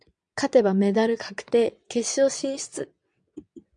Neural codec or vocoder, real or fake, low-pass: vocoder, 22.05 kHz, 80 mel bands, WaveNeXt; fake; 9.9 kHz